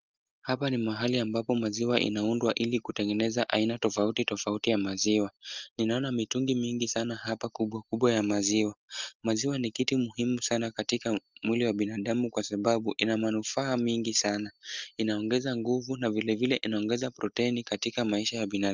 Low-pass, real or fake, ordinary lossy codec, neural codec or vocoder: 7.2 kHz; real; Opus, 24 kbps; none